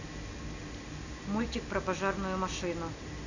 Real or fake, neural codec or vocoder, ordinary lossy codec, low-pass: real; none; none; 7.2 kHz